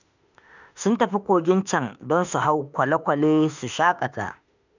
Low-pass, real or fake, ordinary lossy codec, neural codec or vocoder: 7.2 kHz; fake; none; autoencoder, 48 kHz, 32 numbers a frame, DAC-VAE, trained on Japanese speech